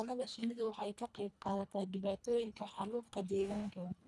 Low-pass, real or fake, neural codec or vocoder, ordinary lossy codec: none; fake; codec, 24 kHz, 1.5 kbps, HILCodec; none